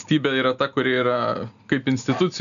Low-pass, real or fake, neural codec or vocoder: 7.2 kHz; real; none